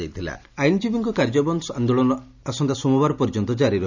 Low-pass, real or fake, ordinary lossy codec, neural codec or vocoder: 7.2 kHz; real; none; none